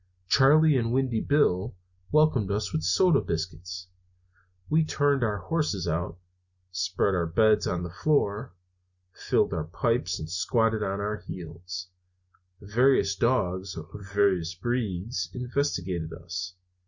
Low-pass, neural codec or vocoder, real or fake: 7.2 kHz; none; real